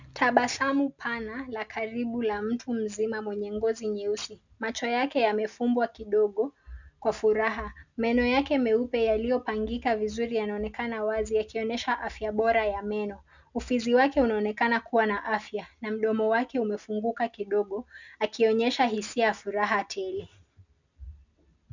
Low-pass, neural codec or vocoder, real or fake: 7.2 kHz; none; real